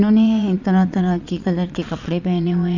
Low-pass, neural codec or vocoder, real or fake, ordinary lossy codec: 7.2 kHz; vocoder, 22.05 kHz, 80 mel bands, Vocos; fake; none